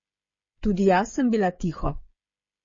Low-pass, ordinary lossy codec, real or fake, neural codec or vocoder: 7.2 kHz; MP3, 32 kbps; fake; codec, 16 kHz, 8 kbps, FreqCodec, smaller model